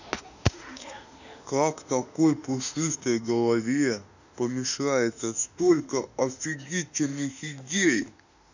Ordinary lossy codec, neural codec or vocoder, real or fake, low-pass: none; autoencoder, 48 kHz, 32 numbers a frame, DAC-VAE, trained on Japanese speech; fake; 7.2 kHz